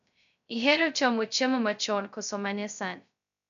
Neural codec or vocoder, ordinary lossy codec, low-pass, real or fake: codec, 16 kHz, 0.2 kbps, FocalCodec; none; 7.2 kHz; fake